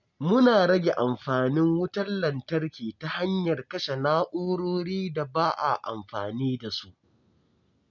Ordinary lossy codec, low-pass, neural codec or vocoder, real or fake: none; 7.2 kHz; none; real